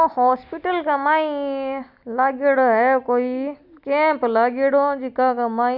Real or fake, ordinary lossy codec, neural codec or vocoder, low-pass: real; none; none; 5.4 kHz